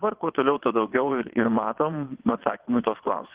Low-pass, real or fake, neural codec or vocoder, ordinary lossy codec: 3.6 kHz; fake; vocoder, 22.05 kHz, 80 mel bands, WaveNeXt; Opus, 16 kbps